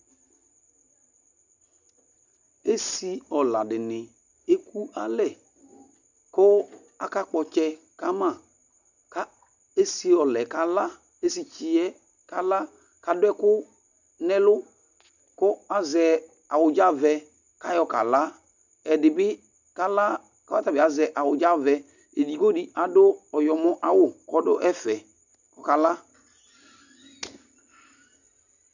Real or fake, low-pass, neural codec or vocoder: real; 7.2 kHz; none